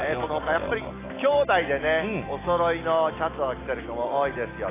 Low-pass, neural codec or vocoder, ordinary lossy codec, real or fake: 3.6 kHz; none; AAC, 16 kbps; real